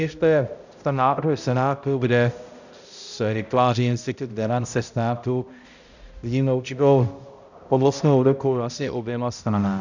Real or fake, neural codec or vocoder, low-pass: fake; codec, 16 kHz, 0.5 kbps, X-Codec, HuBERT features, trained on balanced general audio; 7.2 kHz